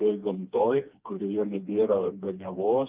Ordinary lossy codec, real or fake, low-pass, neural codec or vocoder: Opus, 24 kbps; fake; 3.6 kHz; codec, 16 kHz, 2 kbps, FreqCodec, smaller model